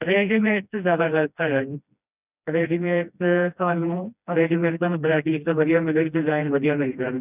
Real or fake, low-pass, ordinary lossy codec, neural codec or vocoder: fake; 3.6 kHz; none; codec, 16 kHz, 1 kbps, FreqCodec, smaller model